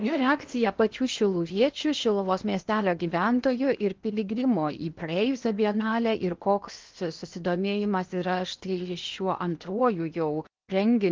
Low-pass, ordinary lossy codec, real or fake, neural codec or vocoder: 7.2 kHz; Opus, 32 kbps; fake; codec, 16 kHz in and 24 kHz out, 0.8 kbps, FocalCodec, streaming, 65536 codes